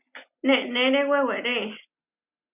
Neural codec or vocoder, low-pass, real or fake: none; 3.6 kHz; real